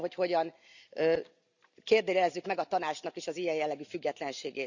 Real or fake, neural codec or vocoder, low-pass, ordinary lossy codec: real; none; 7.2 kHz; none